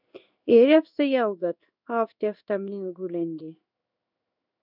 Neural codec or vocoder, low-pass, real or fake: codec, 16 kHz in and 24 kHz out, 1 kbps, XY-Tokenizer; 5.4 kHz; fake